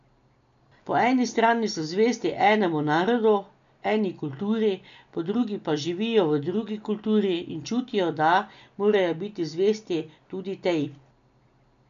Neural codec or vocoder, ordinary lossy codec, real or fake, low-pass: none; MP3, 96 kbps; real; 7.2 kHz